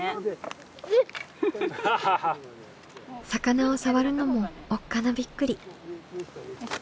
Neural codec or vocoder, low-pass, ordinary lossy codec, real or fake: none; none; none; real